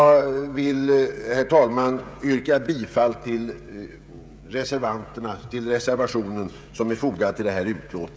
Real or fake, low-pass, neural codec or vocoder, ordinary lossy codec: fake; none; codec, 16 kHz, 16 kbps, FreqCodec, smaller model; none